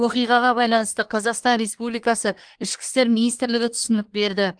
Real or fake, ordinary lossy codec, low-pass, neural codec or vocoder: fake; Opus, 24 kbps; 9.9 kHz; codec, 24 kHz, 1 kbps, SNAC